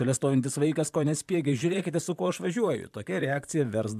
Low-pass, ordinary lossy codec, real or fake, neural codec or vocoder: 14.4 kHz; AAC, 96 kbps; fake; vocoder, 44.1 kHz, 128 mel bands, Pupu-Vocoder